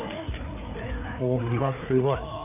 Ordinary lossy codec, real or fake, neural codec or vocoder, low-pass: none; fake; codec, 16 kHz, 4 kbps, FreqCodec, larger model; 3.6 kHz